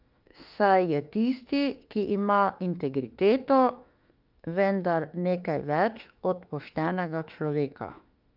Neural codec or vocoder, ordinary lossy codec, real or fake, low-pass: autoencoder, 48 kHz, 32 numbers a frame, DAC-VAE, trained on Japanese speech; Opus, 24 kbps; fake; 5.4 kHz